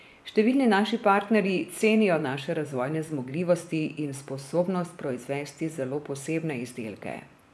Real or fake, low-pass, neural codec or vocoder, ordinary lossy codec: fake; none; vocoder, 24 kHz, 100 mel bands, Vocos; none